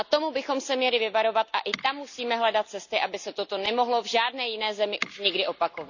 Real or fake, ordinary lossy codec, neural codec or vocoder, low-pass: real; none; none; 7.2 kHz